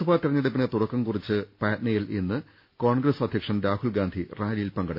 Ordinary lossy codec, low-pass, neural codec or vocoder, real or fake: MP3, 32 kbps; 5.4 kHz; none; real